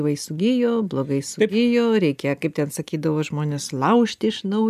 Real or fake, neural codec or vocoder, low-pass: real; none; 14.4 kHz